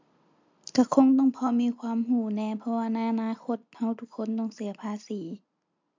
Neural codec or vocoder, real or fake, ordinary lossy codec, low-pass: none; real; MP3, 64 kbps; 7.2 kHz